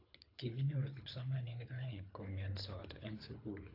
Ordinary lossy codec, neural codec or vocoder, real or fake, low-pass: AAC, 32 kbps; codec, 24 kHz, 3 kbps, HILCodec; fake; 5.4 kHz